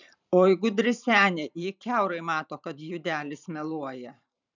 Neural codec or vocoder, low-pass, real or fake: vocoder, 44.1 kHz, 128 mel bands every 512 samples, BigVGAN v2; 7.2 kHz; fake